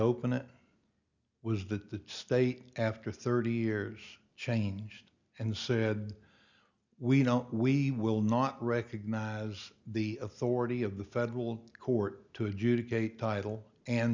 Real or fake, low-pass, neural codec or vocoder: real; 7.2 kHz; none